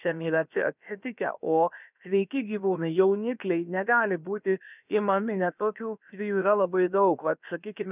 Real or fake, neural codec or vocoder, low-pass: fake; codec, 16 kHz, about 1 kbps, DyCAST, with the encoder's durations; 3.6 kHz